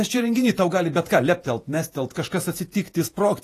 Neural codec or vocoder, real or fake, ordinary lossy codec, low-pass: vocoder, 48 kHz, 128 mel bands, Vocos; fake; AAC, 48 kbps; 14.4 kHz